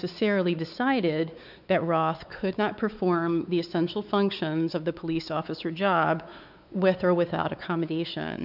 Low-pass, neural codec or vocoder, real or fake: 5.4 kHz; codec, 16 kHz, 4 kbps, X-Codec, WavLM features, trained on Multilingual LibriSpeech; fake